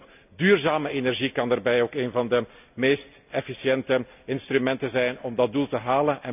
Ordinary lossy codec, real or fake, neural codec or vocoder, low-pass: none; real; none; 3.6 kHz